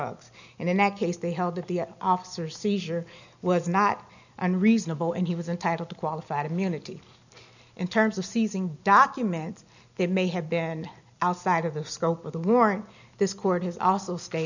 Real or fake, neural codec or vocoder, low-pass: real; none; 7.2 kHz